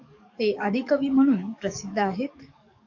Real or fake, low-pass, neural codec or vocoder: fake; 7.2 kHz; codec, 44.1 kHz, 7.8 kbps, DAC